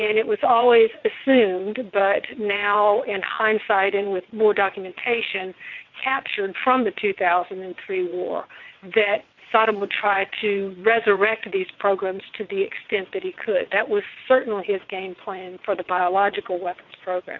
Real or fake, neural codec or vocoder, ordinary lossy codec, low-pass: fake; vocoder, 22.05 kHz, 80 mel bands, WaveNeXt; MP3, 64 kbps; 7.2 kHz